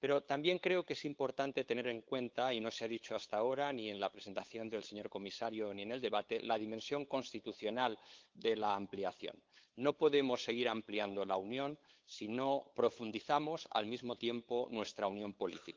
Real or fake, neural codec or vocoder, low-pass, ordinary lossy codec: fake; codec, 16 kHz, 8 kbps, FunCodec, trained on LibriTTS, 25 frames a second; 7.2 kHz; Opus, 32 kbps